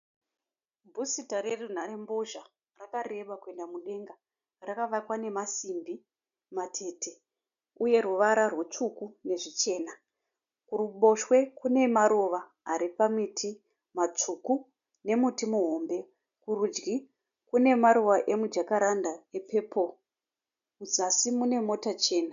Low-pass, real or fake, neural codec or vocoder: 7.2 kHz; real; none